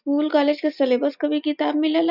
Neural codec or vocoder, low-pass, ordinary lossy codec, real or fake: none; 5.4 kHz; none; real